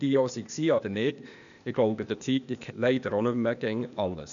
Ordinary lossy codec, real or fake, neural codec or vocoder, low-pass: none; fake; codec, 16 kHz, 0.8 kbps, ZipCodec; 7.2 kHz